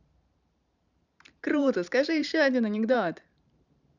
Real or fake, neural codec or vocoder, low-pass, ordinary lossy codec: fake; vocoder, 44.1 kHz, 128 mel bands every 512 samples, BigVGAN v2; 7.2 kHz; none